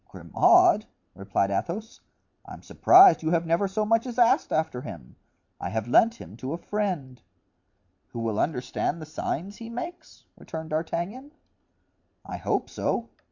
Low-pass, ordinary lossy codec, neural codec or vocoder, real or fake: 7.2 kHz; MP3, 48 kbps; vocoder, 44.1 kHz, 128 mel bands every 512 samples, BigVGAN v2; fake